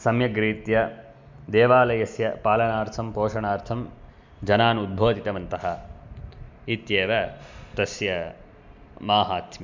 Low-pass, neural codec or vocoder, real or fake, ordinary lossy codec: 7.2 kHz; none; real; MP3, 64 kbps